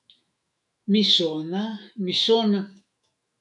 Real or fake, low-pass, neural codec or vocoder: fake; 10.8 kHz; autoencoder, 48 kHz, 128 numbers a frame, DAC-VAE, trained on Japanese speech